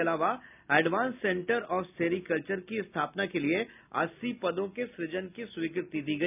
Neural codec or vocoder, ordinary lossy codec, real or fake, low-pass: none; none; real; 3.6 kHz